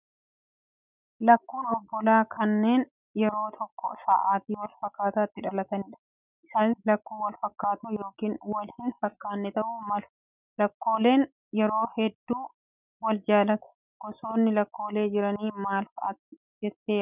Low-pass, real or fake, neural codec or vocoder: 3.6 kHz; real; none